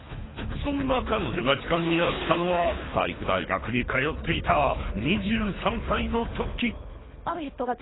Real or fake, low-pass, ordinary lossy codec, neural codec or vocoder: fake; 7.2 kHz; AAC, 16 kbps; codec, 24 kHz, 3 kbps, HILCodec